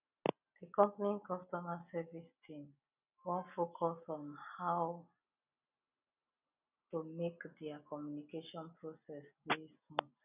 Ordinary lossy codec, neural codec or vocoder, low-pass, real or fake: none; none; 3.6 kHz; real